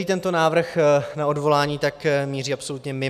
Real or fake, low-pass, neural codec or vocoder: real; 14.4 kHz; none